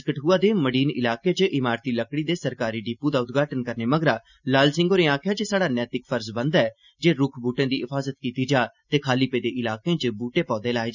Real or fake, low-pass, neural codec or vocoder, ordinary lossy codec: real; 7.2 kHz; none; none